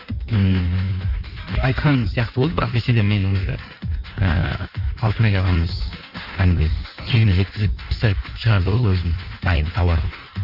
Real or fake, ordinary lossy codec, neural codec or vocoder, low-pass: fake; none; codec, 16 kHz in and 24 kHz out, 1.1 kbps, FireRedTTS-2 codec; 5.4 kHz